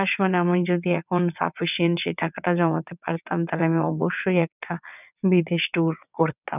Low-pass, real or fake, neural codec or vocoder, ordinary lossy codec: 3.6 kHz; fake; vocoder, 22.05 kHz, 80 mel bands, WaveNeXt; none